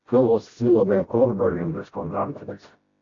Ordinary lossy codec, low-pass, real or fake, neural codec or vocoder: AAC, 32 kbps; 7.2 kHz; fake; codec, 16 kHz, 0.5 kbps, FreqCodec, smaller model